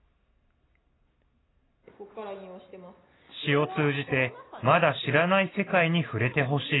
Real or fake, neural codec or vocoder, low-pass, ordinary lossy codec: real; none; 7.2 kHz; AAC, 16 kbps